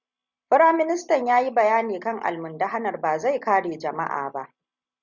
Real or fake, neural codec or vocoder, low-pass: real; none; 7.2 kHz